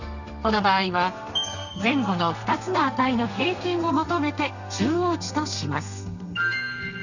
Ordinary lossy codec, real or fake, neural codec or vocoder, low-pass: none; fake; codec, 32 kHz, 1.9 kbps, SNAC; 7.2 kHz